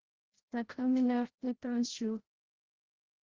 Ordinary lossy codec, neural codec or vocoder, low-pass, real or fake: Opus, 16 kbps; codec, 16 kHz, 0.5 kbps, FreqCodec, larger model; 7.2 kHz; fake